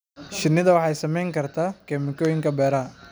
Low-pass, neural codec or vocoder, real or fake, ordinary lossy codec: none; none; real; none